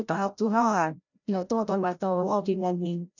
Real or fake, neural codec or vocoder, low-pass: fake; codec, 16 kHz, 0.5 kbps, FreqCodec, larger model; 7.2 kHz